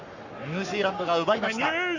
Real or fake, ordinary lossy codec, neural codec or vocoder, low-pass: fake; none; codec, 44.1 kHz, 7.8 kbps, Pupu-Codec; 7.2 kHz